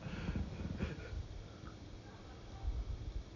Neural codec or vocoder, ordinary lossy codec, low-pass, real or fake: vocoder, 44.1 kHz, 128 mel bands every 256 samples, BigVGAN v2; none; 7.2 kHz; fake